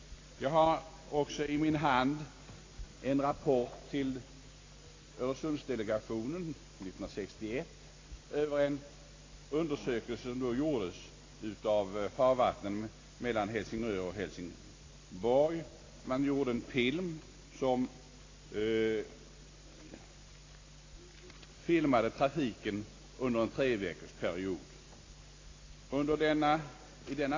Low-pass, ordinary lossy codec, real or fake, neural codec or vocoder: 7.2 kHz; AAC, 32 kbps; real; none